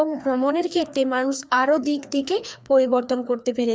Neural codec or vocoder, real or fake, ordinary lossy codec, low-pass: codec, 16 kHz, 2 kbps, FreqCodec, larger model; fake; none; none